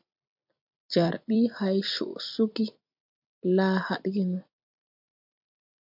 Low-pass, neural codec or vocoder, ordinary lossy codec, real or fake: 5.4 kHz; vocoder, 44.1 kHz, 128 mel bands every 256 samples, BigVGAN v2; MP3, 48 kbps; fake